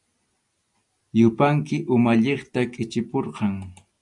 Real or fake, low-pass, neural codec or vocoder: real; 10.8 kHz; none